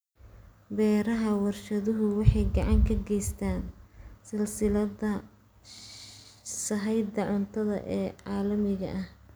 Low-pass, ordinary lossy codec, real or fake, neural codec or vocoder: none; none; real; none